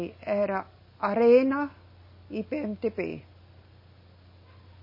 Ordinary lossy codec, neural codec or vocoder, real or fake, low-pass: MP3, 24 kbps; none; real; 5.4 kHz